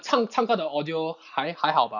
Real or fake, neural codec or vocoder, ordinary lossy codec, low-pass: real; none; none; 7.2 kHz